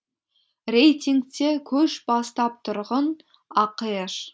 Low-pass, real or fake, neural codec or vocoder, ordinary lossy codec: none; real; none; none